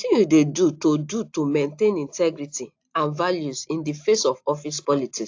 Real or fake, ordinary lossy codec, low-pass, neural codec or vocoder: real; AAC, 48 kbps; 7.2 kHz; none